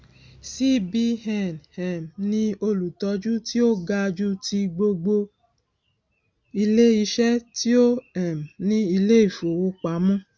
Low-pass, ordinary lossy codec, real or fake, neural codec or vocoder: none; none; real; none